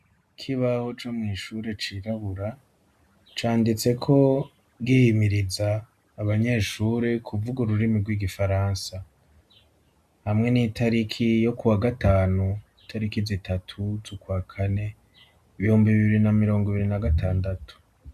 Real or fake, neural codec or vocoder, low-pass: real; none; 14.4 kHz